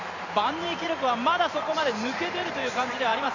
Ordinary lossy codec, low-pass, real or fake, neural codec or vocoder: none; 7.2 kHz; fake; vocoder, 44.1 kHz, 128 mel bands every 512 samples, BigVGAN v2